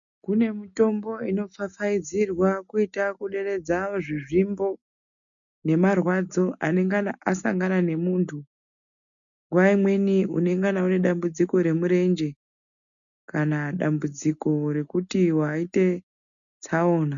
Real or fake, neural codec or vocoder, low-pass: real; none; 7.2 kHz